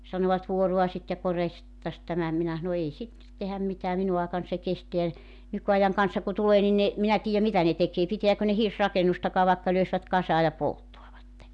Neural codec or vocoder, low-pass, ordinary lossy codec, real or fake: none; none; none; real